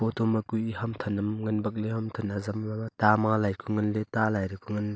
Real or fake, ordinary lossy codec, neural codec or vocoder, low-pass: real; none; none; none